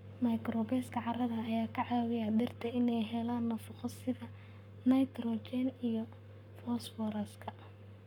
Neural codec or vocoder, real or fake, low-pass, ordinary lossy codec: codec, 44.1 kHz, 7.8 kbps, Pupu-Codec; fake; 19.8 kHz; none